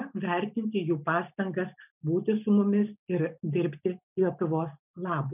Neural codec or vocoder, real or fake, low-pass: none; real; 3.6 kHz